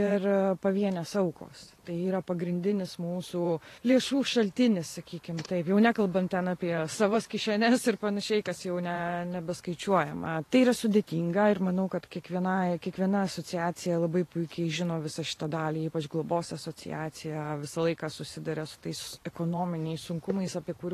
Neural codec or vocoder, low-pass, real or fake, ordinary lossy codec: vocoder, 44.1 kHz, 128 mel bands every 512 samples, BigVGAN v2; 14.4 kHz; fake; AAC, 48 kbps